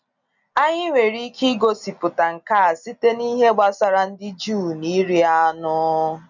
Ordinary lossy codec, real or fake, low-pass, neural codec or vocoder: none; real; 7.2 kHz; none